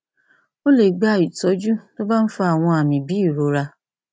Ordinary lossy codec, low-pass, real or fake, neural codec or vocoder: none; none; real; none